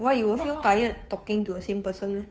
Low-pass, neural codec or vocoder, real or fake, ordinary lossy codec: none; codec, 16 kHz, 2 kbps, FunCodec, trained on Chinese and English, 25 frames a second; fake; none